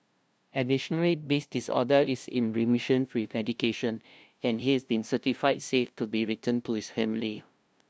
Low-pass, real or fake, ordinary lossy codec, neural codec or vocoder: none; fake; none; codec, 16 kHz, 0.5 kbps, FunCodec, trained on LibriTTS, 25 frames a second